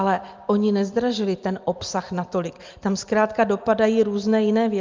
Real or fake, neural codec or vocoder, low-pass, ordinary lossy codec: real; none; 7.2 kHz; Opus, 32 kbps